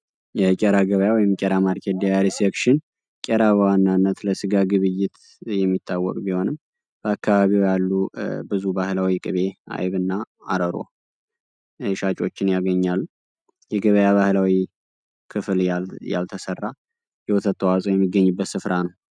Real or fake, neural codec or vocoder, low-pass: real; none; 9.9 kHz